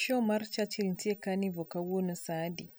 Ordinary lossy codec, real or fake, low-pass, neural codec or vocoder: none; real; none; none